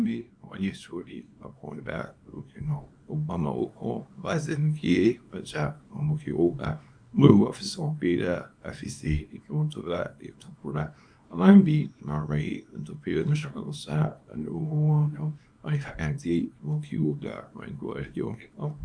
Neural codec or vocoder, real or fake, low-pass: codec, 24 kHz, 0.9 kbps, WavTokenizer, small release; fake; 9.9 kHz